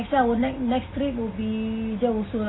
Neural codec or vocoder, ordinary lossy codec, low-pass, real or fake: none; AAC, 16 kbps; 7.2 kHz; real